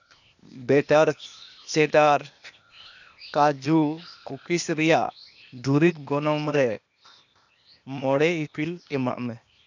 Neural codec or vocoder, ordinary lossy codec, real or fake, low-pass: codec, 16 kHz, 0.8 kbps, ZipCodec; none; fake; 7.2 kHz